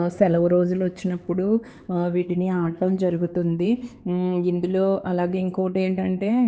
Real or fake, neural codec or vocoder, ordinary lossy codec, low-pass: fake; codec, 16 kHz, 4 kbps, X-Codec, HuBERT features, trained on LibriSpeech; none; none